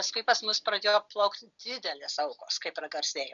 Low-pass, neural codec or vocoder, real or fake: 7.2 kHz; none; real